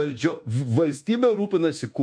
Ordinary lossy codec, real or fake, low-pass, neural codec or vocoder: MP3, 48 kbps; fake; 9.9 kHz; codec, 24 kHz, 1.2 kbps, DualCodec